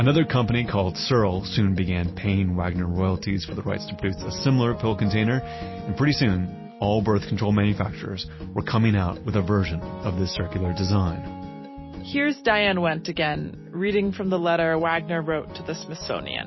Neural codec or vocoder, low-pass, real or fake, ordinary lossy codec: none; 7.2 kHz; real; MP3, 24 kbps